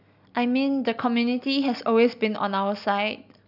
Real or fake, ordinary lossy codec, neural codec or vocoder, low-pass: real; none; none; 5.4 kHz